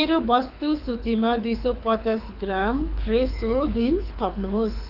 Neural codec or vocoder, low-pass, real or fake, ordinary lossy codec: codec, 24 kHz, 6 kbps, HILCodec; 5.4 kHz; fake; none